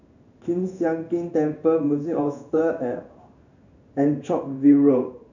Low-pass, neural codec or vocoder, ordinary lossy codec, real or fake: 7.2 kHz; codec, 16 kHz in and 24 kHz out, 1 kbps, XY-Tokenizer; none; fake